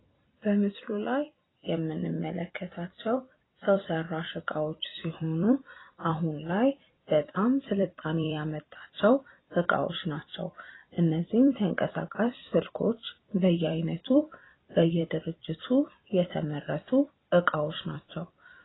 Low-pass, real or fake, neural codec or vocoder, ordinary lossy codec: 7.2 kHz; fake; vocoder, 44.1 kHz, 128 mel bands every 256 samples, BigVGAN v2; AAC, 16 kbps